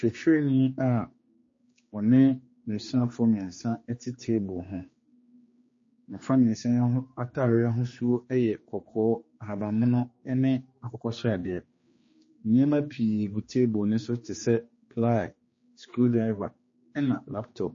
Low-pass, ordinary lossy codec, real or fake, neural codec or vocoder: 7.2 kHz; MP3, 32 kbps; fake; codec, 16 kHz, 2 kbps, X-Codec, HuBERT features, trained on general audio